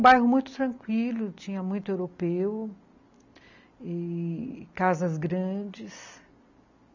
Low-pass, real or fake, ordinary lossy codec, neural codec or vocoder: 7.2 kHz; real; none; none